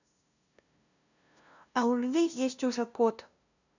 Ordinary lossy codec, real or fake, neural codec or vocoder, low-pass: none; fake; codec, 16 kHz, 0.5 kbps, FunCodec, trained on LibriTTS, 25 frames a second; 7.2 kHz